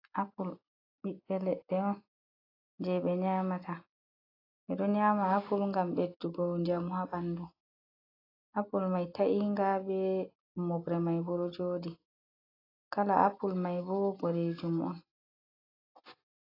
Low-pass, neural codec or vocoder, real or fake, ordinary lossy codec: 5.4 kHz; none; real; AAC, 24 kbps